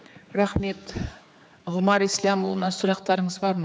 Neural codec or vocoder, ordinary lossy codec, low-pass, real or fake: codec, 16 kHz, 4 kbps, X-Codec, HuBERT features, trained on general audio; none; none; fake